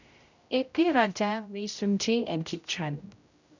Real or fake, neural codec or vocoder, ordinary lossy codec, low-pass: fake; codec, 16 kHz, 0.5 kbps, X-Codec, HuBERT features, trained on general audio; none; 7.2 kHz